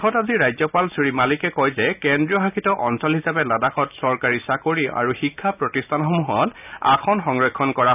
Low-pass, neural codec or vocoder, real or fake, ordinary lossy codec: 3.6 kHz; none; real; none